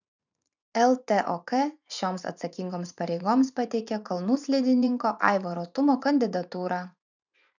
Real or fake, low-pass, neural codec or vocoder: fake; 7.2 kHz; vocoder, 24 kHz, 100 mel bands, Vocos